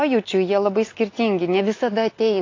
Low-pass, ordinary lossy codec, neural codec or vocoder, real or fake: 7.2 kHz; AAC, 32 kbps; none; real